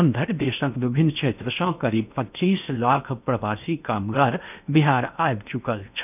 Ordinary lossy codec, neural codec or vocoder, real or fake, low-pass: none; codec, 16 kHz in and 24 kHz out, 0.8 kbps, FocalCodec, streaming, 65536 codes; fake; 3.6 kHz